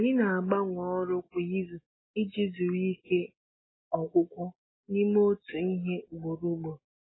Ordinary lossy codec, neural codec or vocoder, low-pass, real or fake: AAC, 16 kbps; none; 7.2 kHz; real